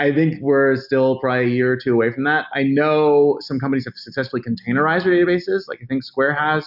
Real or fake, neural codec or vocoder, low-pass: real; none; 5.4 kHz